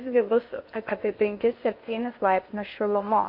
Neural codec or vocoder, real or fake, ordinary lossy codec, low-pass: codec, 16 kHz in and 24 kHz out, 0.8 kbps, FocalCodec, streaming, 65536 codes; fake; MP3, 32 kbps; 5.4 kHz